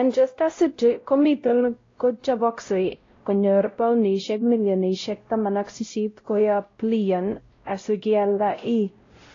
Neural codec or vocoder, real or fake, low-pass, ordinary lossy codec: codec, 16 kHz, 0.5 kbps, X-Codec, WavLM features, trained on Multilingual LibriSpeech; fake; 7.2 kHz; AAC, 32 kbps